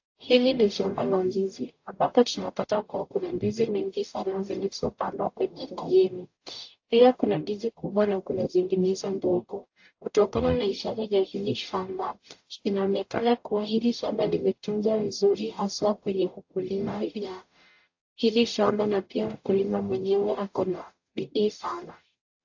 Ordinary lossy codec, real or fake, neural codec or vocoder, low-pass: AAC, 48 kbps; fake; codec, 44.1 kHz, 0.9 kbps, DAC; 7.2 kHz